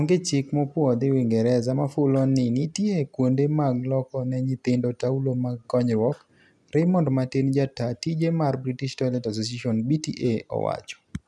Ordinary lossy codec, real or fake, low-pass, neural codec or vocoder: none; real; none; none